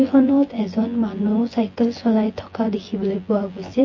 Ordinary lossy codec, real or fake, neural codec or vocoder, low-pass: MP3, 32 kbps; fake; vocoder, 24 kHz, 100 mel bands, Vocos; 7.2 kHz